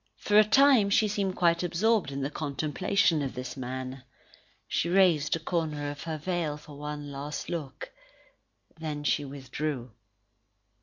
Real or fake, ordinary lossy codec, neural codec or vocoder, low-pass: real; MP3, 48 kbps; none; 7.2 kHz